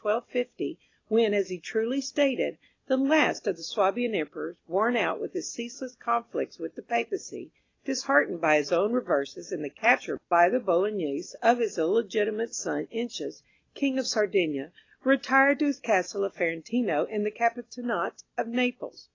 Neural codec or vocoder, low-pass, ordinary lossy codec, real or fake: none; 7.2 kHz; AAC, 32 kbps; real